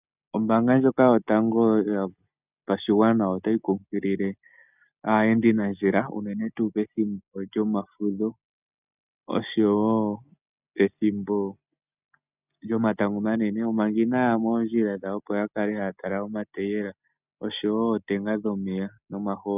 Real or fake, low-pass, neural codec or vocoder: real; 3.6 kHz; none